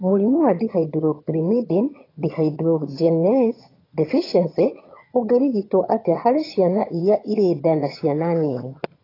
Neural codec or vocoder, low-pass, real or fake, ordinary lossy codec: vocoder, 22.05 kHz, 80 mel bands, HiFi-GAN; 5.4 kHz; fake; AAC, 24 kbps